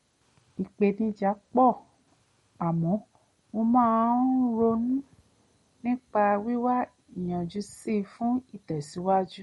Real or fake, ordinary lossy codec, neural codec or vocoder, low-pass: real; MP3, 48 kbps; none; 14.4 kHz